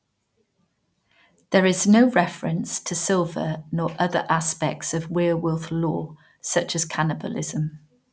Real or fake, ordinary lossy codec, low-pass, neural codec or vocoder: real; none; none; none